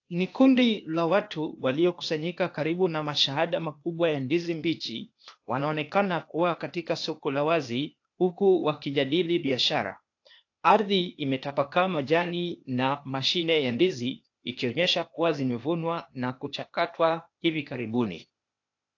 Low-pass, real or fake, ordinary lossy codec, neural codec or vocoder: 7.2 kHz; fake; AAC, 48 kbps; codec, 16 kHz, 0.8 kbps, ZipCodec